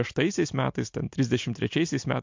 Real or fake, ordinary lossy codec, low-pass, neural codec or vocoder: real; MP3, 48 kbps; 7.2 kHz; none